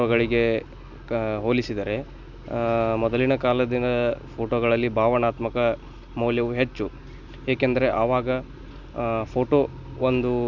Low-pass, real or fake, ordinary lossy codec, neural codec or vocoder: 7.2 kHz; real; none; none